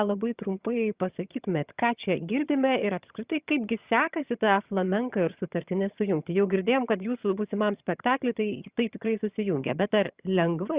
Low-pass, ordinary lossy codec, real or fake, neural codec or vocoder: 3.6 kHz; Opus, 64 kbps; fake; vocoder, 22.05 kHz, 80 mel bands, HiFi-GAN